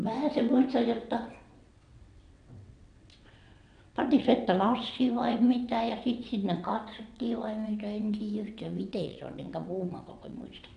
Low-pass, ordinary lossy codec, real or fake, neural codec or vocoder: 9.9 kHz; none; fake; vocoder, 22.05 kHz, 80 mel bands, WaveNeXt